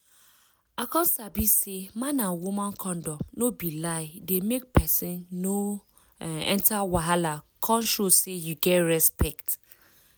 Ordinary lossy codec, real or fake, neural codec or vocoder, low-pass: none; real; none; none